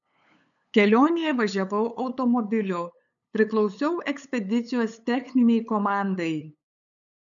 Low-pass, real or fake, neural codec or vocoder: 7.2 kHz; fake; codec, 16 kHz, 8 kbps, FunCodec, trained on LibriTTS, 25 frames a second